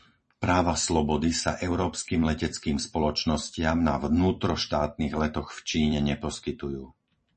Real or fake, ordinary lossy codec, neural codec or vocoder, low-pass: fake; MP3, 32 kbps; vocoder, 44.1 kHz, 128 mel bands every 256 samples, BigVGAN v2; 10.8 kHz